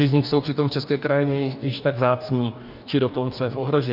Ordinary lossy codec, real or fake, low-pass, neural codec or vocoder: MP3, 48 kbps; fake; 5.4 kHz; codec, 44.1 kHz, 2.6 kbps, DAC